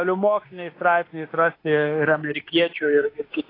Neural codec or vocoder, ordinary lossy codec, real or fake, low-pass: autoencoder, 48 kHz, 32 numbers a frame, DAC-VAE, trained on Japanese speech; AAC, 24 kbps; fake; 5.4 kHz